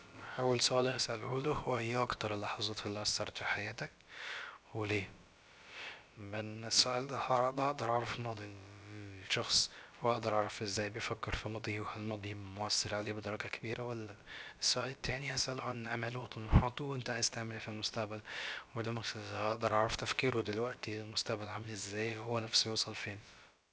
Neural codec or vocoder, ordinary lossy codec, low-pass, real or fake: codec, 16 kHz, about 1 kbps, DyCAST, with the encoder's durations; none; none; fake